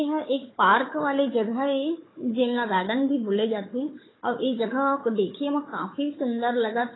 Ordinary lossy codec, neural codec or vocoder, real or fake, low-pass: AAC, 16 kbps; codec, 16 kHz, 4 kbps, FunCodec, trained on Chinese and English, 50 frames a second; fake; 7.2 kHz